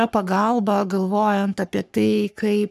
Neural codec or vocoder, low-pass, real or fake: codec, 44.1 kHz, 3.4 kbps, Pupu-Codec; 14.4 kHz; fake